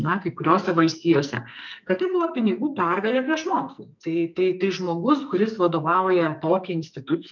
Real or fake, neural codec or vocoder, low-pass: fake; codec, 44.1 kHz, 2.6 kbps, SNAC; 7.2 kHz